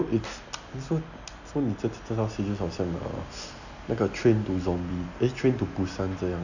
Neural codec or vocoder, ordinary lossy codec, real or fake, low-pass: none; none; real; 7.2 kHz